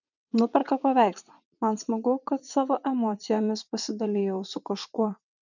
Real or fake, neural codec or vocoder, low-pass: fake; vocoder, 22.05 kHz, 80 mel bands, WaveNeXt; 7.2 kHz